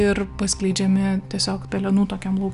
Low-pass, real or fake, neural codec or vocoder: 10.8 kHz; real; none